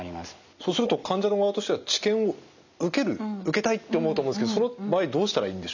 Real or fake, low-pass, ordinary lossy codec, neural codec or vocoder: real; 7.2 kHz; none; none